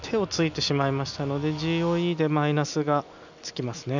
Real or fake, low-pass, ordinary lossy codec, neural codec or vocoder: real; 7.2 kHz; none; none